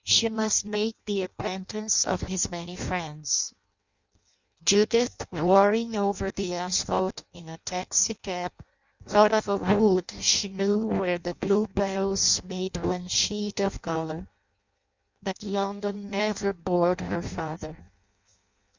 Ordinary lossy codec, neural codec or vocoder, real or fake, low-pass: Opus, 64 kbps; codec, 16 kHz in and 24 kHz out, 0.6 kbps, FireRedTTS-2 codec; fake; 7.2 kHz